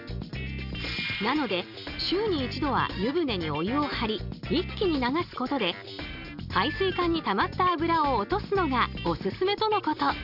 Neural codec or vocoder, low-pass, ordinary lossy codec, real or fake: none; 5.4 kHz; none; real